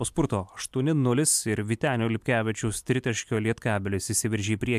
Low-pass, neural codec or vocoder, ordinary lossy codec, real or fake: 14.4 kHz; none; MP3, 96 kbps; real